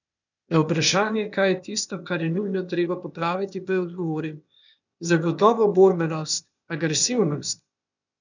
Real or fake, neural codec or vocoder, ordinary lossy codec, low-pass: fake; codec, 16 kHz, 0.8 kbps, ZipCodec; none; 7.2 kHz